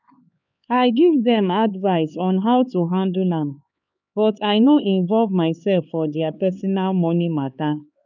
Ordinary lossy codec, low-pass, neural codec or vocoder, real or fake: none; 7.2 kHz; codec, 16 kHz, 4 kbps, X-Codec, HuBERT features, trained on LibriSpeech; fake